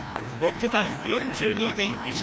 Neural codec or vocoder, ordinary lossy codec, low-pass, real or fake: codec, 16 kHz, 1 kbps, FreqCodec, larger model; none; none; fake